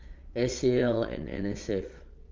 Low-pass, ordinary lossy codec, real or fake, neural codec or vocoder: 7.2 kHz; Opus, 32 kbps; fake; vocoder, 22.05 kHz, 80 mel bands, Vocos